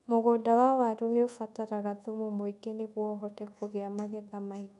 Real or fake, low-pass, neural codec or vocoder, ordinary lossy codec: fake; 10.8 kHz; codec, 24 kHz, 1.2 kbps, DualCodec; none